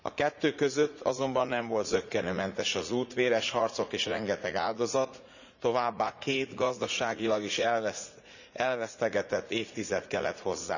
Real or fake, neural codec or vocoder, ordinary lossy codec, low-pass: fake; vocoder, 44.1 kHz, 80 mel bands, Vocos; none; 7.2 kHz